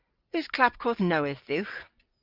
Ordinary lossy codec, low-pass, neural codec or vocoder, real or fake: Opus, 24 kbps; 5.4 kHz; none; real